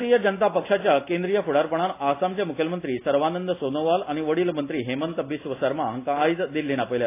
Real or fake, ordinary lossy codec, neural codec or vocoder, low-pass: real; AAC, 24 kbps; none; 3.6 kHz